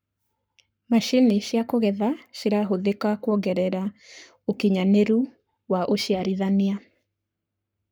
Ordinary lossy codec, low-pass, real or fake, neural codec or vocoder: none; none; fake; codec, 44.1 kHz, 7.8 kbps, Pupu-Codec